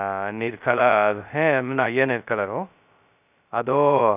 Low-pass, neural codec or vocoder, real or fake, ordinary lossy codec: 3.6 kHz; codec, 16 kHz, 0.2 kbps, FocalCodec; fake; none